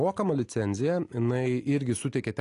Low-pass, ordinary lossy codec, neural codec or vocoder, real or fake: 10.8 kHz; AAC, 48 kbps; none; real